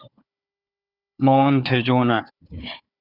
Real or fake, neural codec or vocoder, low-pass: fake; codec, 16 kHz, 4 kbps, FunCodec, trained on Chinese and English, 50 frames a second; 5.4 kHz